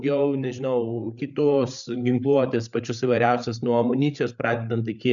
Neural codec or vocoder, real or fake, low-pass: codec, 16 kHz, 8 kbps, FreqCodec, larger model; fake; 7.2 kHz